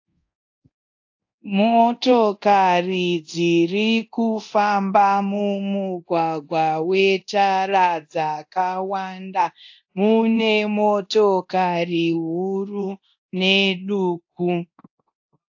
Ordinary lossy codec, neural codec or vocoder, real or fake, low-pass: AAC, 48 kbps; codec, 24 kHz, 0.9 kbps, DualCodec; fake; 7.2 kHz